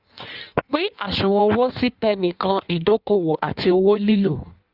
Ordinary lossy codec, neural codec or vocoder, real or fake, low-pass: Opus, 64 kbps; codec, 16 kHz in and 24 kHz out, 1.1 kbps, FireRedTTS-2 codec; fake; 5.4 kHz